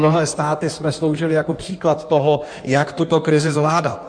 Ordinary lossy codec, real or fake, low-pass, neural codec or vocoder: MP3, 96 kbps; fake; 9.9 kHz; codec, 16 kHz in and 24 kHz out, 1.1 kbps, FireRedTTS-2 codec